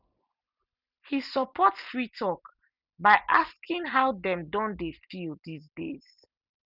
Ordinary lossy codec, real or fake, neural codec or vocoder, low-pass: none; real; none; 5.4 kHz